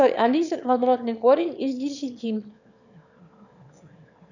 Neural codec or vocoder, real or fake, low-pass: autoencoder, 22.05 kHz, a latent of 192 numbers a frame, VITS, trained on one speaker; fake; 7.2 kHz